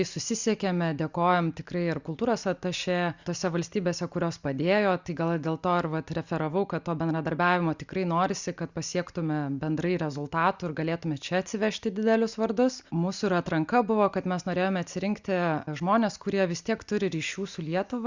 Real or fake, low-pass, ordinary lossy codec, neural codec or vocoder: real; 7.2 kHz; Opus, 64 kbps; none